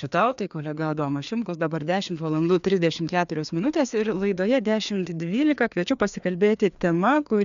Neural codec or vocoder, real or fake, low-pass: codec, 16 kHz, 2 kbps, FreqCodec, larger model; fake; 7.2 kHz